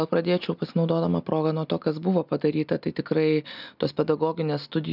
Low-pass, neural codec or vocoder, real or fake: 5.4 kHz; none; real